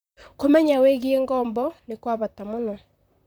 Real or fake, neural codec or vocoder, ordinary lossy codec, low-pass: fake; vocoder, 44.1 kHz, 128 mel bands, Pupu-Vocoder; none; none